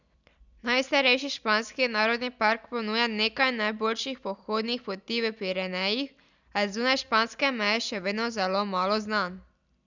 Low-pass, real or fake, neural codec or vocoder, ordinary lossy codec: 7.2 kHz; real; none; none